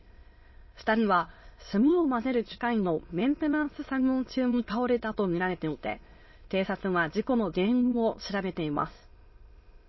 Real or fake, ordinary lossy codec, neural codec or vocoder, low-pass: fake; MP3, 24 kbps; autoencoder, 22.05 kHz, a latent of 192 numbers a frame, VITS, trained on many speakers; 7.2 kHz